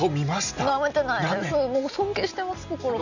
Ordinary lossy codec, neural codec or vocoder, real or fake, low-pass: none; none; real; 7.2 kHz